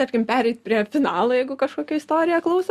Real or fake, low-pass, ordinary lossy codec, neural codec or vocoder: real; 14.4 kHz; Opus, 64 kbps; none